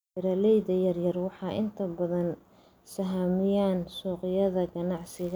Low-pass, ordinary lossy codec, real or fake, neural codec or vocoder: none; none; real; none